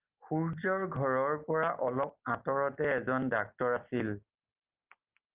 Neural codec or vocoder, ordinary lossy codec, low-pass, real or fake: none; Opus, 32 kbps; 3.6 kHz; real